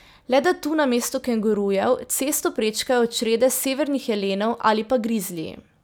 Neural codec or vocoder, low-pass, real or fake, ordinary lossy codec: none; none; real; none